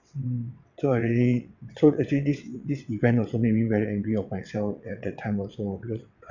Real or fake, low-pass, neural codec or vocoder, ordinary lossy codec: fake; 7.2 kHz; vocoder, 22.05 kHz, 80 mel bands, WaveNeXt; none